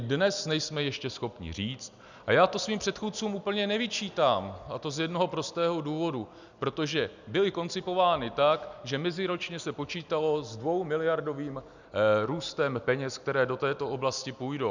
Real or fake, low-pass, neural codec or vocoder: real; 7.2 kHz; none